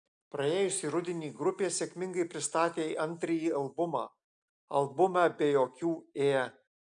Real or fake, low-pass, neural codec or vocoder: real; 9.9 kHz; none